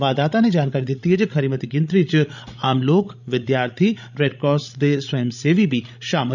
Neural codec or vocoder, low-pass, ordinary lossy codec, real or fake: codec, 16 kHz, 16 kbps, FreqCodec, larger model; 7.2 kHz; none; fake